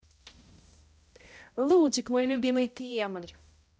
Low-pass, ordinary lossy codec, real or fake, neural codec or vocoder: none; none; fake; codec, 16 kHz, 0.5 kbps, X-Codec, HuBERT features, trained on balanced general audio